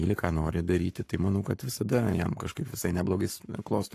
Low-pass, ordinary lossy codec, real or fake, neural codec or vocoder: 14.4 kHz; AAC, 64 kbps; fake; codec, 44.1 kHz, 7.8 kbps, Pupu-Codec